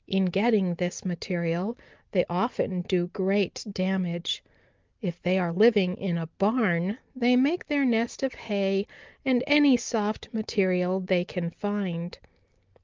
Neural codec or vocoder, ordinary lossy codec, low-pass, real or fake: none; Opus, 24 kbps; 7.2 kHz; real